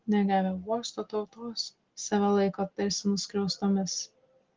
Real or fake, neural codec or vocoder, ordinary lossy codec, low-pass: real; none; Opus, 16 kbps; 7.2 kHz